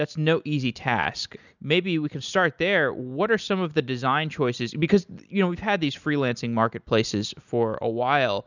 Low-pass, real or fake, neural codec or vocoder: 7.2 kHz; real; none